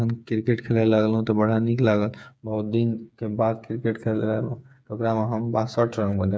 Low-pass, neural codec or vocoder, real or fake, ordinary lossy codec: none; codec, 16 kHz, 8 kbps, FreqCodec, smaller model; fake; none